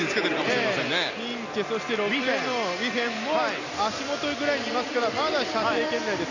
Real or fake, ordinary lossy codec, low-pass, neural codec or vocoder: real; none; 7.2 kHz; none